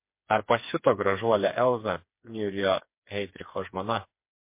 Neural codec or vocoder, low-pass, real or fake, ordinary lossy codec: codec, 16 kHz, 4 kbps, FreqCodec, smaller model; 3.6 kHz; fake; MP3, 24 kbps